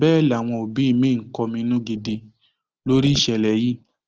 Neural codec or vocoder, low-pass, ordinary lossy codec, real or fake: none; 7.2 kHz; Opus, 16 kbps; real